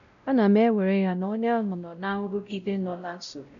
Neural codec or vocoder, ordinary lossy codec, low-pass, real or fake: codec, 16 kHz, 0.5 kbps, X-Codec, WavLM features, trained on Multilingual LibriSpeech; none; 7.2 kHz; fake